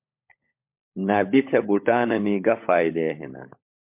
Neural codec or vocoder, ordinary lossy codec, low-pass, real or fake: codec, 16 kHz, 16 kbps, FunCodec, trained on LibriTTS, 50 frames a second; MP3, 32 kbps; 3.6 kHz; fake